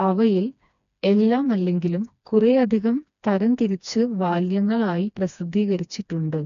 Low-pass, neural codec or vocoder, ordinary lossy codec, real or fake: 7.2 kHz; codec, 16 kHz, 2 kbps, FreqCodec, smaller model; none; fake